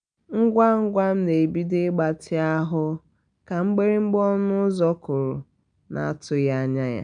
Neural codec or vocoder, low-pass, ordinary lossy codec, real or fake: none; 9.9 kHz; none; real